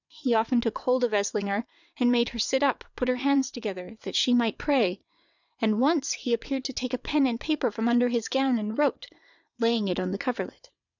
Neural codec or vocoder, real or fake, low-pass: vocoder, 22.05 kHz, 80 mel bands, WaveNeXt; fake; 7.2 kHz